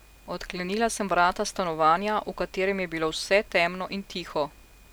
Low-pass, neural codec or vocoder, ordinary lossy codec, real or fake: none; none; none; real